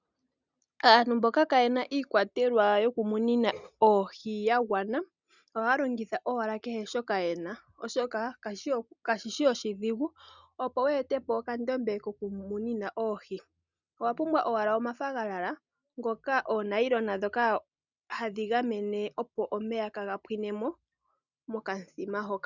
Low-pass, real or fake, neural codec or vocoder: 7.2 kHz; real; none